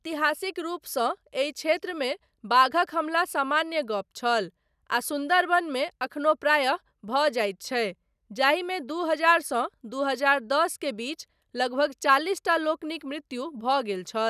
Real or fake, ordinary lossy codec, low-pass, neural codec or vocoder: real; none; 14.4 kHz; none